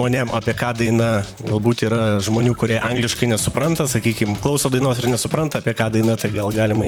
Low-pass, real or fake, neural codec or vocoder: 19.8 kHz; fake; vocoder, 44.1 kHz, 128 mel bands, Pupu-Vocoder